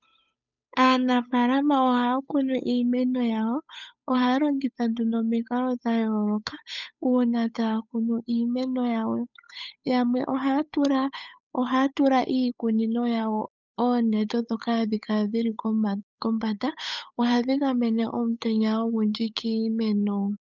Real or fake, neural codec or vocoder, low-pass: fake; codec, 16 kHz, 8 kbps, FunCodec, trained on Chinese and English, 25 frames a second; 7.2 kHz